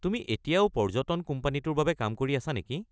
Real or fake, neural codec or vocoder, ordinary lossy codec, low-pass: real; none; none; none